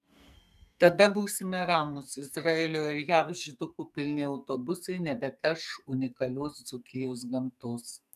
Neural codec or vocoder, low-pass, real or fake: codec, 44.1 kHz, 2.6 kbps, SNAC; 14.4 kHz; fake